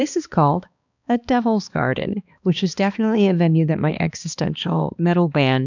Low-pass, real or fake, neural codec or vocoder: 7.2 kHz; fake; codec, 16 kHz, 2 kbps, X-Codec, HuBERT features, trained on balanced general audio